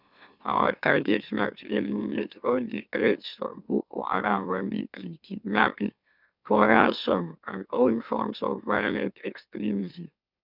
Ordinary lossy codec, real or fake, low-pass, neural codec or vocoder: none; fake; 5.4 kHz; autoencoder, 44.1 kHz, a latent of 192 numbers a frame, MeloTTS